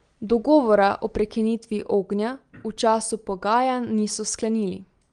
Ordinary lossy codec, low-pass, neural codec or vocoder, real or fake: Opus, 24 kbps; 9.9 kHz; none; real